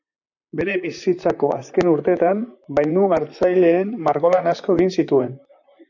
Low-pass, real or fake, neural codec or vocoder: 7.2 kHz; fake; vocoder, 44.1 kHz, 128 mel bands, Pupu-Vocoder